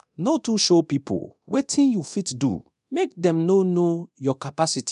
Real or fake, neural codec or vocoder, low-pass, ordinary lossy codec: fake; codec, 24 kHz, 0.9 kbps, DualCodec; 10.8 kHz; none